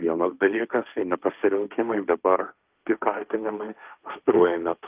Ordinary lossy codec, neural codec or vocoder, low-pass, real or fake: Opus, 24 kbps; codec, 16 kHz, 1.1 kbps, Voila-Tokenizer; 3.6 kHz; fake